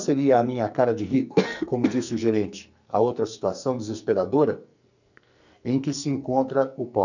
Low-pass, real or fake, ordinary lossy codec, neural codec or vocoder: 7.2 kHz; fake; none; codec, 44.1 kHz, 2.6 kbps, SNAC